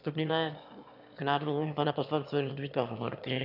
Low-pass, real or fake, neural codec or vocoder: 5.4 kHz; fake; autoencoder, 22.05 kHz, a latent of 192 numbers a frame, VITS, trained on one speaker